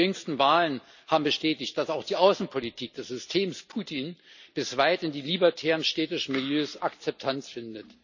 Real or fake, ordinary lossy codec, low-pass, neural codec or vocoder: real; none; 7.2 kHz; none